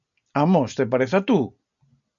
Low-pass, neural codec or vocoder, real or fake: 7.2 kHz; none; real